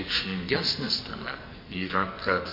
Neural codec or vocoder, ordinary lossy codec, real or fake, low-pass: codec, 44.1 kHz, 2.6 kbps, SNAC; MP3, 32 kbps; fake; 5.4 kHz